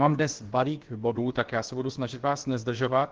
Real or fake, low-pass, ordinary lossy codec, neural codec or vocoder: fake; 7.2 kHz; Opus, 16 kbps; codec, 16 kHz, about 1 kbps, DyCAST, with the encoder's durations